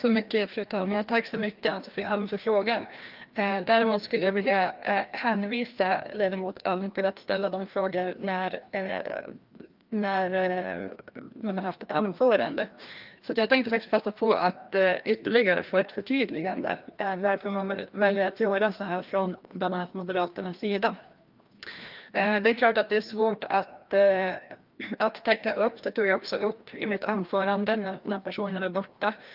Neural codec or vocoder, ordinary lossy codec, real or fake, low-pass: codec, 16 kHz, 1 kbps, FreqCodec, larger model; Opus, 32 kbps; fake; 5.4 kHz